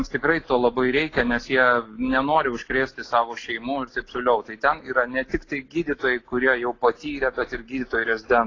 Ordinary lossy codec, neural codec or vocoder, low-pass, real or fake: AAC, 32 kbps; none; 7.2 kHz; real